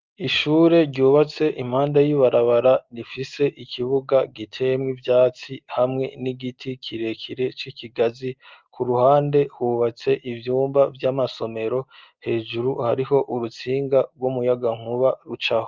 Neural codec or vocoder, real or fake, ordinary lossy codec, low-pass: none; real; Opus, 24 kbps; 7.2 kHz